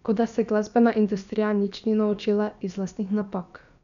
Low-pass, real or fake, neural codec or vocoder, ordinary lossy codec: 7.2 kHz; fake; codec, 16 kHz, about 1 kbps, DyCAST, with the encoder's durations; none